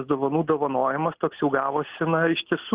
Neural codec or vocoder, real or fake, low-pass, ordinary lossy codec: none; real; 3.6 kHz; Opus, 16 kbps